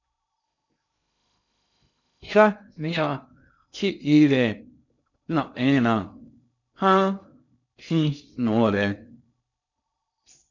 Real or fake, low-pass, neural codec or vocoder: fake; 7.2 kHz; codec, 16 kHz in and 24 kHz out, 0.6 kbps, FocalCodec, streaming, 2048 codes